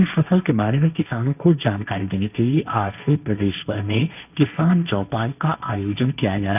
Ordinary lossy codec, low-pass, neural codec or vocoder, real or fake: none; 3.6 kHz; codec, 16 kHz, 1.1 kbps, Voila-Tokenizer; fake